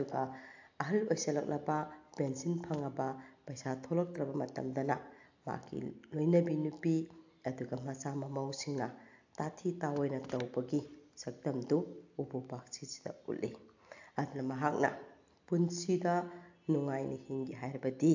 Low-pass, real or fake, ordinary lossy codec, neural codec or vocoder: 7.2 kHz; real; AAC, 48 kbps; none